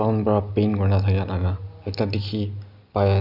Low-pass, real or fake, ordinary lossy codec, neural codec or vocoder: 5.4 kHz; real; none; none